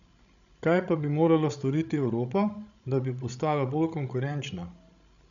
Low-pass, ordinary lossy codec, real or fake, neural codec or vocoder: 7.2 kHz; MP3, 96 kbps; fake; codec, 16 kHz, 16 kbps, FreqCodec, larger model